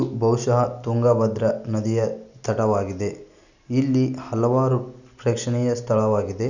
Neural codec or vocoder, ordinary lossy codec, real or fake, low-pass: none; none; real; 7.2 kHz